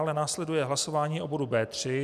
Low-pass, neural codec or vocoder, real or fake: 14.4 kHz; none; real